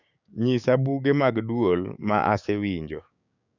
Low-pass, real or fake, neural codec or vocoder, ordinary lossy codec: 7.2 kHz; fake; codec, 16 kHz, 6 kbps, DAC; none